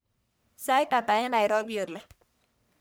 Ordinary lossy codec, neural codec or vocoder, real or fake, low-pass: none; codec, 44.1 kHz, 1.7 kbps, Pupu-Codec; fake; none